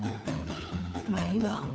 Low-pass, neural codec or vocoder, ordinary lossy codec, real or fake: none; codec, 16 kHz, 4 kbps, FunCodec, trained on LibriTTS, 50 frames a second; none; fake